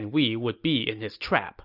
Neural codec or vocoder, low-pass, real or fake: none; 5.4 kHz; real